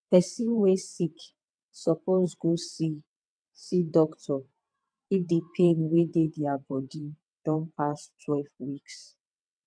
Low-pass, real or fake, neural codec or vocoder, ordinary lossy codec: 9.9 kHz; fake; vocoder, 22.05 kHz, 80 mel bands, WaveNeXt; none